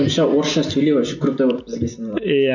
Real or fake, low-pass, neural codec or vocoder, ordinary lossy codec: real; 7.2 kHz; none; none